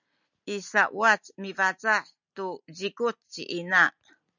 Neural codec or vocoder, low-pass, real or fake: none; 7.2 kHz; real